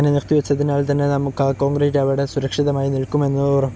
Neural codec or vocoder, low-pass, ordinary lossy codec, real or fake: none; none; none; real